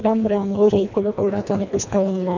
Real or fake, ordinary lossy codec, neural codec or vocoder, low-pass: fake; none; codec, 24 kHz, 1.5 kbps, HILCodec; 7.2 kHz